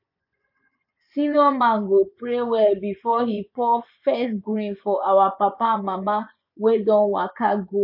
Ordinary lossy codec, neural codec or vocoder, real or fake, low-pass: none; vocoder, 24 kHz, 100 mel bands, Vocos; fake; 5.4 kHz